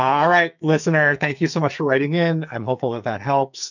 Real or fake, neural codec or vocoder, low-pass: fake; codec, 44.1 kHz, 2.6 kbps, SNAC; 7.2 kHz